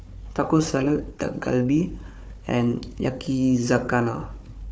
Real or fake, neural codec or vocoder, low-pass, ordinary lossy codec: fake; codec, 16 kHz, 4 kbps, FunCodec, trained on Chinese and English, 50 frames a second; none; none